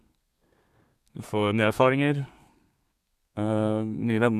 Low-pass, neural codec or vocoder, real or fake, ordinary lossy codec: 14.4 kHz; codec, 32 kHz, 1.9 kbps, SNAC; fake; none